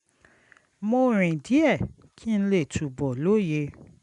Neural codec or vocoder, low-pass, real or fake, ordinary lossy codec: none; 10.8 kHz; real; none